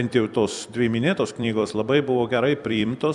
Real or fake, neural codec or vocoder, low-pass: fake; vocoder, 44.1 kHz, 128 mel bands every 256 samples, BigVGAN v2; 10.8 kHz